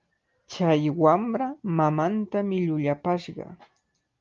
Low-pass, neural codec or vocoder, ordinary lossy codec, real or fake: 7.2 kHz; none; Opus, 32 kbps; real